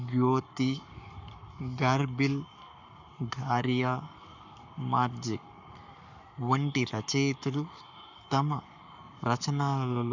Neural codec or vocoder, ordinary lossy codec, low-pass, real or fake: codec, 44.1 kHz, 7.8 kbps, Pupu-Codec; none; 7.2 kHz; fake